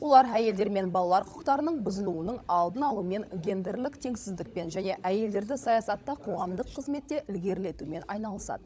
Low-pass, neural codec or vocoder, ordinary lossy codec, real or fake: none; codec, 16 kHz, 16 kbps, FunCodec, trained on LibriTTS, 50 frames a second; none; fake